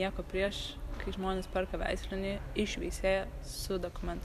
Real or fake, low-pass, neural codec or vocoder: fake; 14.4 kHz; vocoder, 44.1 kHz, 128 mel bands every 256 samples, BigVGAN v2